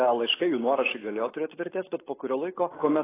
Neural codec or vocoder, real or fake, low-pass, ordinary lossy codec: none; real; 3.6 kHz; AAC, 16 kbps